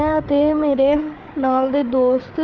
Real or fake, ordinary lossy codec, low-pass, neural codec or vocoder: fake; none; none; codec, 16 kHz, 8 kbps, FreqCodec, larger model